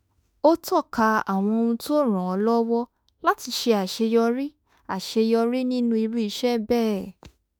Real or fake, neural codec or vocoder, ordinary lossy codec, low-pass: fake; autoencoder, 48 kHz, 32 numbers a frame, DAC-VAE, trained on Japanese speech; none; none